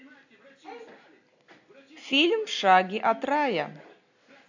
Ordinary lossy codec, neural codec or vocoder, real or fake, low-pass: AAC, 48 kbps; none; real; 7.2 kHz